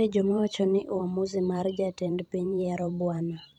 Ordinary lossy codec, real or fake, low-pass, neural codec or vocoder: none; fake; 19.8 kHz; vocoder, 44.1 kHz, 128 mel bands every 512 samples, BigVGAN v2